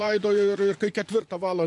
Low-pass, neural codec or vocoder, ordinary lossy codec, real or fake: 10.8 kHz; vocoder, 24 kHz, 100 mel bands, Vocos; MP3, 64 kbps; fake